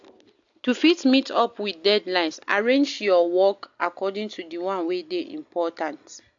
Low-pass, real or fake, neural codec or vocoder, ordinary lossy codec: 7.2 kHz; real; none; AAC, 64 kbps